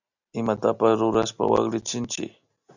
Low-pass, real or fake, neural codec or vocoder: 7.2 kHz; real; none